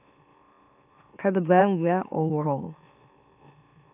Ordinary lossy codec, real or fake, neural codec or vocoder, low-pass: none; fake; autoencoder, 44.1 kHz, a latent of 192 numbers a frame, MeloTTS; 3.6 kHz